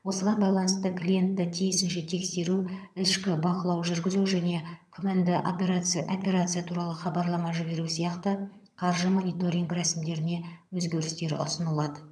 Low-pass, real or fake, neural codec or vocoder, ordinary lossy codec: none; fake; vocoder, 22.05 kHz, 80 mel bands, HiFi-GAN; none